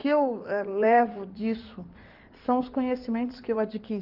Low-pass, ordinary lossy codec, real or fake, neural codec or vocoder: 5.4 kHz; Opus, 32 kbps; fake; codec, 16 kHz in and 24 kHz out, 2.2 kbps, FireRedTTS-2 codec